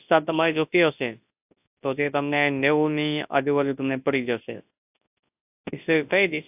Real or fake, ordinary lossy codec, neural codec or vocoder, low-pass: fake; AAC, 32 kbps; codec, 24 kHz, 0.9 kbps, WavTokenizer, large speech release; 3.6 kHz